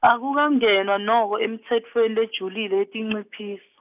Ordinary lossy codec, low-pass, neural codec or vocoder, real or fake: none; 3.6 kHz; none; real